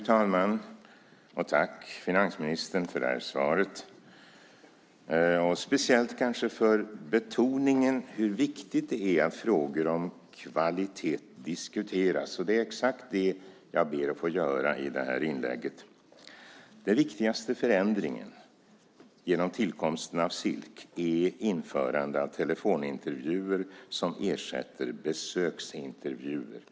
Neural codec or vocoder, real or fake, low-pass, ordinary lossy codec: none; real; none; none